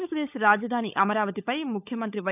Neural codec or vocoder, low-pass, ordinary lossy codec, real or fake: codec, 16 kHz, 16 kbps, FunCodec, trained on LibriTTS, 50 frames a second; 3.6 kHz; none; fake